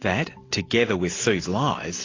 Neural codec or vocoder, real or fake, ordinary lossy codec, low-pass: none; real; AAC, 32 kbps; 7.2 kHz